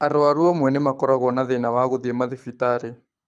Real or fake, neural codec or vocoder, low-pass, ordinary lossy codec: fake; codec, 24 kHz, 6 kbps, HILCodec; none; none